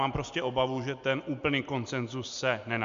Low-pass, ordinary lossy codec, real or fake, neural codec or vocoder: 7.2 kHz; MP3, 64 kbps; real; none